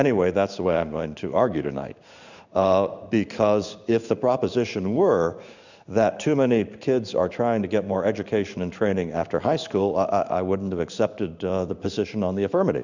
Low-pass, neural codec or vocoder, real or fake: 7.2 kHz; codec, 16 kHz in and 24 kHz out, 1 kbps, XY-Tokenizer; fake